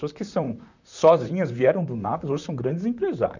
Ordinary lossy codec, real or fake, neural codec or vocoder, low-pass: none; fake; vocoder, 44.1 kHz, 128 mel bands, Pupu-Vocoder; 7.2 kHz